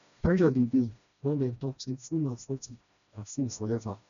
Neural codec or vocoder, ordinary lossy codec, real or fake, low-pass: codec, 16 kHz, 1 kbps, FreqCodec, smaller model; none; fake; 7.2 kHz